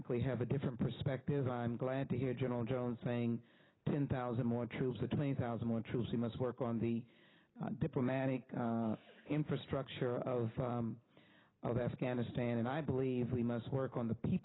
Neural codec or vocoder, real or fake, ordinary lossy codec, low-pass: none; real; AAC, 16 kbps; 7.2 kHz